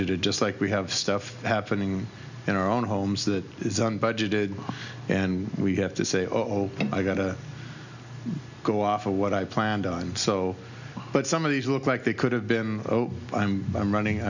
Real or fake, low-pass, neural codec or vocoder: real; 7.2 kHz; none